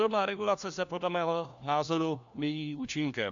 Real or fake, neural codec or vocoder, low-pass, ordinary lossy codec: fake; codec, 16 kHz, 1 kbps, FunCodec, trained on LibriTTS, 50 frames a second; 7.2 kHz; MP3, 64 kbps